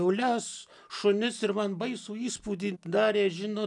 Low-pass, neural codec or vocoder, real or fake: 10.8 kHz; none; real